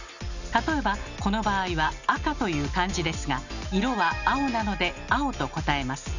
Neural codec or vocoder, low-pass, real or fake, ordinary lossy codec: none; 7.2 kHz; real; none